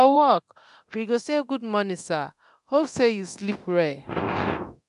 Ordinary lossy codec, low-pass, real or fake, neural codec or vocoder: AAC, 96 kbps; 10.8 kHz; fake; codec, 24 kHz, 0.9 kbps, DualCodec